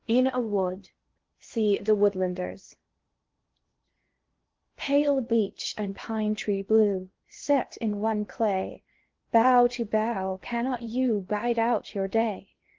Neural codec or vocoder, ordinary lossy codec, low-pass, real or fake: codec, 16 kHz in and 24 kHz out, 0.8 kbps, FocalCodec, streaming, 65536 codes; Opus, 16 kbps; 7.2 kHz; fake